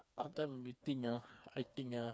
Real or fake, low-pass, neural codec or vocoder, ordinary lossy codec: fake; none; codec, 16 kHz, 4 kbps, FreqCodec, smaller model; none